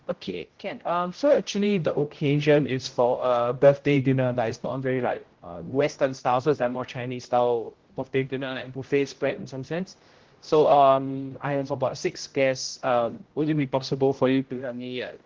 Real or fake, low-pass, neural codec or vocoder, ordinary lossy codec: fake; 7.2 kHz; codec, 16 kHz, 0.5 kbps, X-Codec, HuBERT features, trained on general audio; Opus, 16 kbps